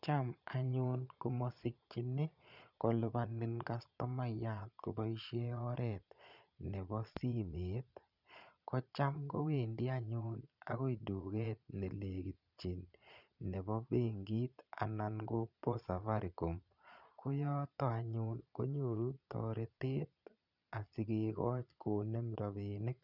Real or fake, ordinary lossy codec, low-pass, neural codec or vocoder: fake; none; 5.4 kHz; vocoder, 22.05 kHz, 80 mel bands, Vocos